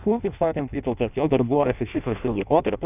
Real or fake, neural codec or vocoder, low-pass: fake; codec, 16 kHz in and 24 kHz out, 0.6 kbps, FireRedTTS-2 codec; 3.6 kHz